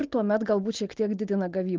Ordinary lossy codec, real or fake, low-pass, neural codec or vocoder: Opus, 16 kbps; real; 7.2 kHz; none